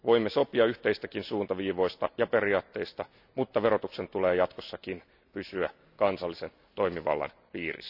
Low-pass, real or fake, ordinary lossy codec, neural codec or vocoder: 5.4 kHz; real; none; none